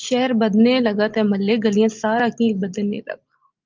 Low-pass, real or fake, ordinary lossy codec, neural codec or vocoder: 7.2 kHz; real; Opus, 24 kbps; none